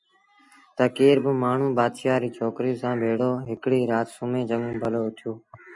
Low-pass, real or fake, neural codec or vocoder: 10.8 kHz; real; none